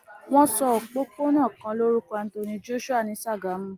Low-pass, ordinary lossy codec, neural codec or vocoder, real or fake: 14.4 kHz; Opus, 32 kbps; none; real